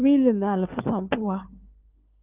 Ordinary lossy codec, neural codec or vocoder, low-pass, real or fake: Opus, 24 kbps; codec, 16 kHz, 4 kbps, FunCodec, trained on LibriTTS, 50 frames a second; 3.6 kHz; fake